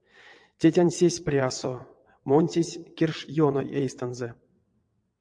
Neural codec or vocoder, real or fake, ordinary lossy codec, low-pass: vocoder, 22.05 kHz, 80 mel bands, WaveNeXt; fake; MP3, 64 kbps; 9.9 kHz